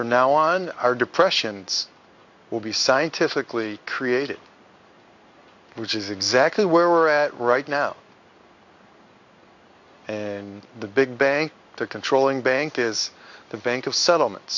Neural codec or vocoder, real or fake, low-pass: codec, 16 kHz in and 24 kHz out, 1 kbps, XY-Tokenizer; fake; 7.2 kHz